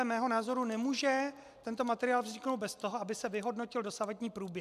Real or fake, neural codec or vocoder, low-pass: real; none; 14.4 kHz